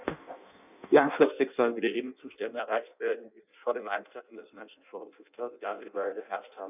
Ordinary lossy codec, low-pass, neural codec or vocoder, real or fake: none; 3.6 kHz; codec, 16 kHz in and 24 kHz out, 0.6 kbps, FireRedTTS-2 codec; fake